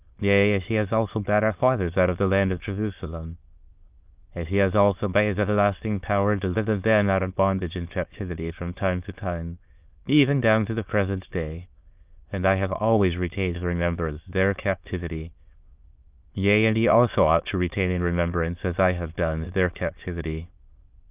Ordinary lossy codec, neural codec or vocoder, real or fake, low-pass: Opus, 24 kbps; autoencoder, 22.05 kHz, a latent of 192 numbers a frame, VITS, trained on many speakers; fake; 3.6 kHz